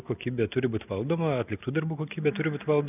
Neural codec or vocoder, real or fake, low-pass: none; real; 3.6 kHz